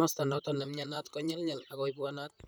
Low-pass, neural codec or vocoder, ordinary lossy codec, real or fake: none; vocoder, 44.1 kHz, 128 mel bands, Pupu-Vocoder; none; fake